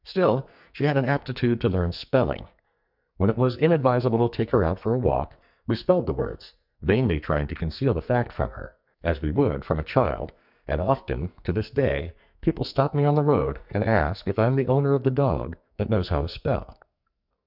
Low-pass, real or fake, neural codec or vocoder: 5.4 kHz; fake; codec, 44.1 kHz, 2.6 kbps, SNAC